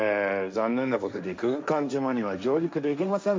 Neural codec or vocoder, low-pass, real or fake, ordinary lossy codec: codec, 16 kHz, 1.1 kbps, Voila-Tokenizer; none; fake; none